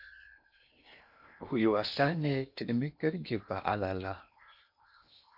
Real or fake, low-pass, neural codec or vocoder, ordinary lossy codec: fake; 5.4 kHz; codec, 16 kHz in and 24 kHz out, 0.8 kbps, FocalCodec, streaming, 65536 codes; AAC, 48 kbps